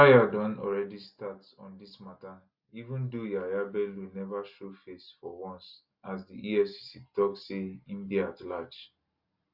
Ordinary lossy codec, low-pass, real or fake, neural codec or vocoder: Opus, 64 kbps; 5.4 kHz; real; none